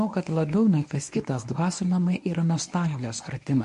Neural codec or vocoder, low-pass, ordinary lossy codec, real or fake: codec, 24 kHz, 0.9 kbps, WavTokenizer, medium speech release version 1; 10.8 kHz; MP3, 48 kbps; fake